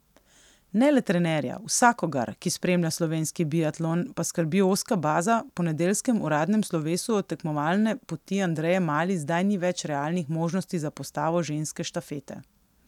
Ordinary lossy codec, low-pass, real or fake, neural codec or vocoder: none; 19.8 kHz; real; none